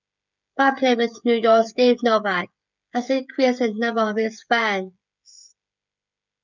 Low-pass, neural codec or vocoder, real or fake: 7.2 kHz; codec, 16 kHz, 16 kbps, FreqCodec, smaller model; fake